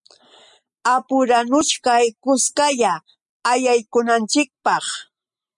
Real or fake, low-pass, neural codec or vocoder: real; 10.8 kHz; none